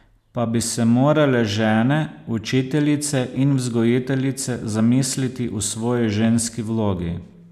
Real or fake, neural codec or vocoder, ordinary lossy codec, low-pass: real; none; none; 14.4 kHz